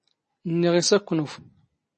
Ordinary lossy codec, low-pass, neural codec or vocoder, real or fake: MP3, 32 kbps; 10.8 kHz; none; real